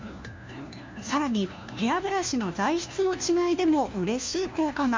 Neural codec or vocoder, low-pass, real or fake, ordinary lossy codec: codec, 16 kHz, 1 kbps, FunCodec, trained on LibriTTS, 50 frames a second; 7.2 kHz; fake; MP3, 64 kbps